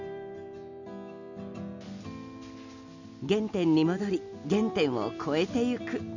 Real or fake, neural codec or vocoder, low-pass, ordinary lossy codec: real; none; 7.2 kHz; none